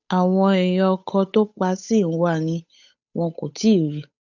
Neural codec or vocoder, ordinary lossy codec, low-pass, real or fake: codec, 16 kHz, 8 kbps, FunCodec, trained on Chinese and English, 25 frames a second; none; 7.2 kHz; fake